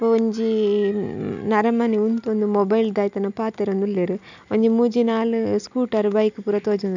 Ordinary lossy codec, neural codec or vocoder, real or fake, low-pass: none; none; real; 7.2 kHz